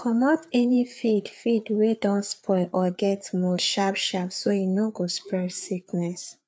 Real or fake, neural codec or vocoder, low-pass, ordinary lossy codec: fake; codec, 16 kHz, 4 kbps, FreqCodec, larger model; none; none